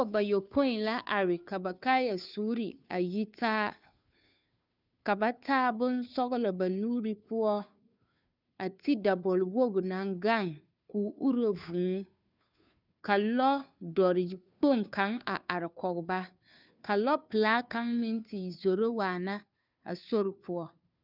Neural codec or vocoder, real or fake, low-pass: codec, 16 kHz, 2 kbps, FunCodec, trained on Chinese and English, 25 frames a second; fake; 5.4 kHz